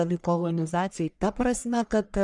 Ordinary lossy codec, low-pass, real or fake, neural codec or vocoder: MP3, 96 kbps; 10.8 kHz; fake; codec, 44.1 kHz, 1.7 kbps, Pupu-Codec